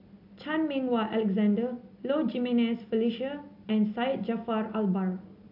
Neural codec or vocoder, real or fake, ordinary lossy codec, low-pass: none; real; AAC, 48 kbps; 5.4 kHz